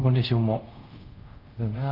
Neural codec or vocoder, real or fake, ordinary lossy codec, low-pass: codec, 24 kHz, 0.5 kbps, DualCodec; fake; Opus, 24 kbps; 5.4 kHz